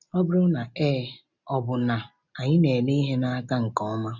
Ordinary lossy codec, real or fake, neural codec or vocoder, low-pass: none; real; none; 7.2 kHz